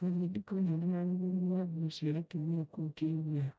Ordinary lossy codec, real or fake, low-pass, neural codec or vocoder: none; fake; none; codec, 16 kHz, 0.5 kbps, FreqCodec, smaller model